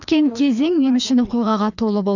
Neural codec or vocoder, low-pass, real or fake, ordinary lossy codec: codec, 16 kHz, 2 kbps, FreqCodec, larger model; 7.2 kHz; fake; none